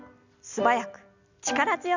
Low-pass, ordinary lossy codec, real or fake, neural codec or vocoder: 7.2 kHz; none; real; none